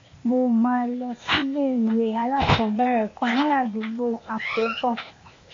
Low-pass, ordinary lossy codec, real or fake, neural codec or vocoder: 7.2 kHz; AAC, 48 kbps; fake; codec, 16 kHz, 0.8 kbps, ZipCodec